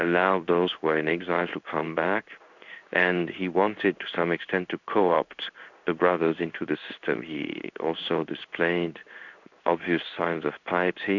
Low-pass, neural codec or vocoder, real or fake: 7.2 kHz; codec, 16 kHz in and 24 kHz out, 1 kbps, XY-Tokenizer; fake